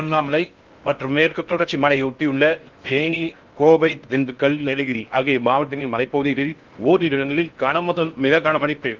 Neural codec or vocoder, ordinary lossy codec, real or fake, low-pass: codec, 16 kHz in and 24 kHz out, 0.6 kbps, FocalCodec, streaming, 4096 codes; Opus, 24 kbps; fake; 7.2 kHz